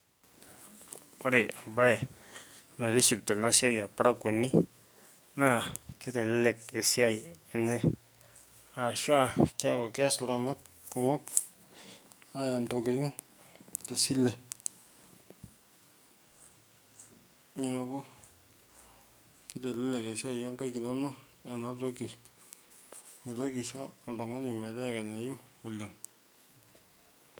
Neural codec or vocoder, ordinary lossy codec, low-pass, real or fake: codec, 44.1 kHz, 2.6 kbps, SNAC; none; none; fake